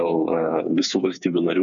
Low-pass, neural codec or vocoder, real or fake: 7.2 kHz; codec, 16 kHz, 4 kbps, FreqCodec, smaller model; fake